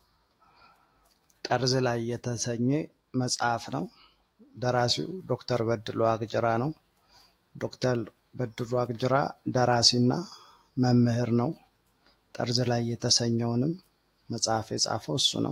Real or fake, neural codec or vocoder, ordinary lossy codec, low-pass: fake; autoencoder, 48 kHz, 128 numbers a frame, DAC-VAE, trained on Japanese speech; AAC, 48 kbps; 19.8 kHz